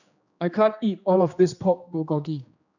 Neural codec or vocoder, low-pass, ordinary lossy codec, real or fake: codec, 16 kHz, 2 kbps, X-Codec, HuBERT features, trained on general audio; 7.2 kHz; none; fake